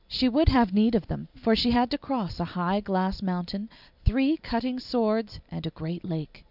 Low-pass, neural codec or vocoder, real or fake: 5.4 kHz; none; real